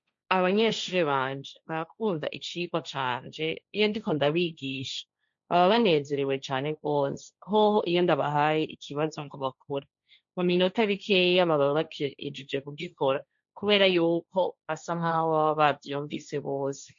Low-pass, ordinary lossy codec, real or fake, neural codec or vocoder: 7.2 kHz; MP3, 48 kbps; fake; codec, 16 kHz, 1.1 kbps, Voila-Tokenizer